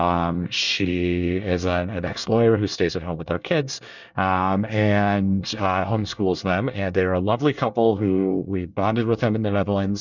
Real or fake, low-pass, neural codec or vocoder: fake; 7.2 kHz; codec, 24 kHz, 1 kbps, SNAC